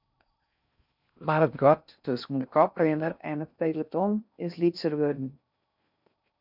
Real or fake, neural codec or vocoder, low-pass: fake; codec, 16 kHz in and 24 kHz out, 0.6 kbps, FocalCodec, streaming, 4096 codes; 5.4 kHz